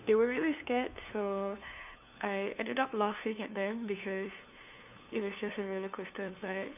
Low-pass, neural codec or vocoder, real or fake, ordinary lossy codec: 3.6 kHz; codec, 16 kHz, 2 kbps, FunCodec, trained on LibriTTS, 25 frames a second; fake; none